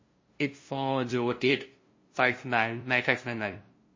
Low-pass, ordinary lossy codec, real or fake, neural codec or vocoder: 7.2 kHz; MP3, 32 kbps; fake; codec, 16 kHz, 0.5 kbps, FunCodec, trained on LibriTTS, 25 frames a second